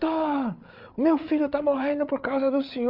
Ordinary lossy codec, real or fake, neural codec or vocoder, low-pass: none; fake; codec, 16 kHz, 8 kbps, FreqCodec, larger model; 5.4 kHz